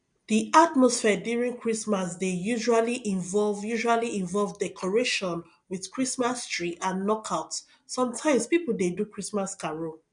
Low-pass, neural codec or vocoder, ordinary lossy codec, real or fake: 9.9 kHz; none; MP3, 64 kbps; real